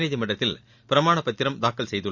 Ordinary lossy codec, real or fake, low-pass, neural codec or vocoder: Opus, 64 kbps; real; 7.2 kHz; none